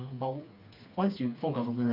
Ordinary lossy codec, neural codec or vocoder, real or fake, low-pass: none; codec, 16 kHz, 4 kbps, FreqCodec, smaller model; fake; 5.4 kHz